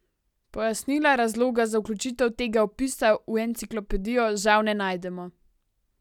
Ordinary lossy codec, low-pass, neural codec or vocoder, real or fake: none; 19.8 kHz; none; real